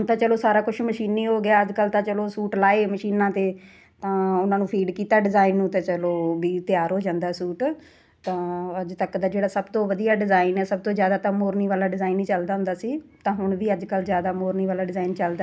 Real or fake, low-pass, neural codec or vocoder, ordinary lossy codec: real; none; none; none